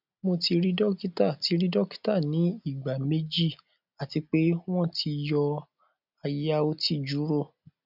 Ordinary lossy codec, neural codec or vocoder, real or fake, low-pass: none; none; real; 5.4 kHz